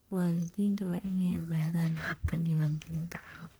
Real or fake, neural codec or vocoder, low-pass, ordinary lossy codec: fake; codec, 44.1 kHz, 1.7 kbps, Pupu-Codec; none; none